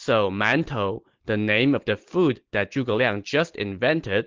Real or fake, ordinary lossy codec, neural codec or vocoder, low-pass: real; Opus, 16 kbps; none; 7.2 kHz